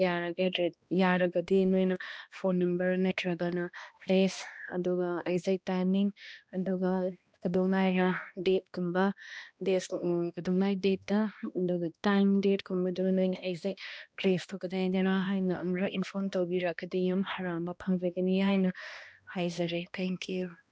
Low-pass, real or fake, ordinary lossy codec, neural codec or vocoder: none; fake; none; codec, 16 kHz, 1 kbps, X-Codec, HuBERT features, trained on balanced general audio